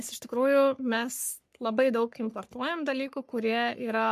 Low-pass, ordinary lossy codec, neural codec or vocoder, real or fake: 14.4 kHz; MP3, 64 kbps; codec, 44.1 kHz, 3.4 kbps, Pupu-Codec; fake